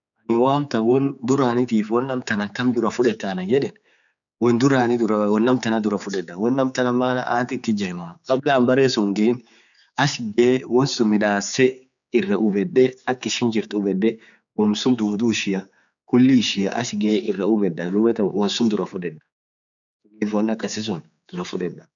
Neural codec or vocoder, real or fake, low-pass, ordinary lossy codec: codec, 16 kHz, 4 kbps, X-Codec, HuBERT features, trained on general audio; fake; 7.2 kHz; none